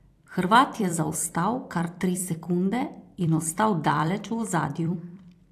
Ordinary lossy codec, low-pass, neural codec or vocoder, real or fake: AAC, 64 kbps; 14.4 kHz; vocoder, 44.1 kHz, 128 mel bands every 256 samples, BigVGAN v2; fake